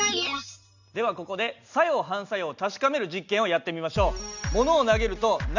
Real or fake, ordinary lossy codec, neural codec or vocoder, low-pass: real; none; none; 7.2 kHz